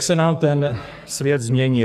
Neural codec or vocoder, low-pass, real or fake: codec, 44.1 kHz, 2.6 kbps, SNAC; 14.4 kHz; fake